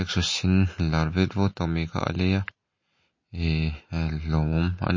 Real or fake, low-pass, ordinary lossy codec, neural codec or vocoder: real; 7.2 kHz; MP3, 32 kbps; none